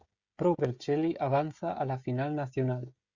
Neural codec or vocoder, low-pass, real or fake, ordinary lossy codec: codec, 16 kHz, 16 kbps, FreqCodec, smaller model; 7.2 kHz; fake; Opus, 64 kbps